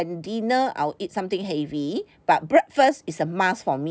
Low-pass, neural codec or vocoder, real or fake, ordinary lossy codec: none; none; real; none